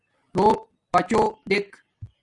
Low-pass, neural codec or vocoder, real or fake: 10.8 kHz; none; real